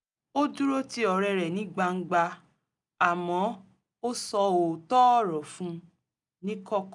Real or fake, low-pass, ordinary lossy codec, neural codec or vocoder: real; 10.8 kHz; none; none